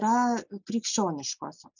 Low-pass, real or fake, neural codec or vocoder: 7.2 kHz; real; none